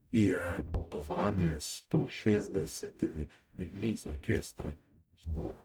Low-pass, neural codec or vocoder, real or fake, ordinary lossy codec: none; codec, 44.1 kHz, 0.9 kbps, DAC; fake; none